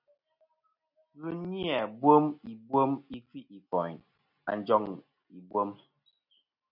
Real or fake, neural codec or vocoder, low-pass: real; none; 5.4 kHz